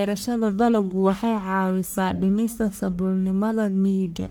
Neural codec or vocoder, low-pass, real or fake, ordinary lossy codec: codec, 44.1 kHz, 1.7 kbps, Pupu-Codec; none; fake; none